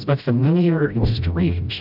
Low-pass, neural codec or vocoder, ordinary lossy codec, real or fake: 5.4 kHz; codec, 16 kHz, 0.5 kbps, FreqCodec, smaller model; AAC, 48 kbps; fake